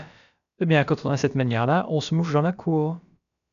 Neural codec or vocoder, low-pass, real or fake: codec, 16 kHz, about 1 kbps, DyCAST, with the encoder's durations; 7.2 kHz; fake